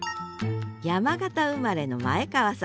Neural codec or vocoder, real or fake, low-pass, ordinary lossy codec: none; real; none; none